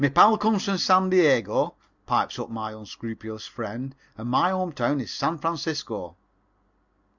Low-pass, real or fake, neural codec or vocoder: 7.2 kHz; real; none